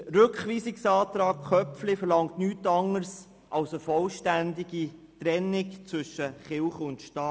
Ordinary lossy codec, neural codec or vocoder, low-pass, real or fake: none; none; none; real